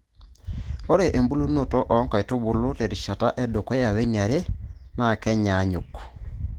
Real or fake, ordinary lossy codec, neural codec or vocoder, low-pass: fake; Opus, 16 kbps; autoencoder, 48 kHz, 128 numbers a frame, DAC-VAE, trained on Japanese speech; 19.8 kHz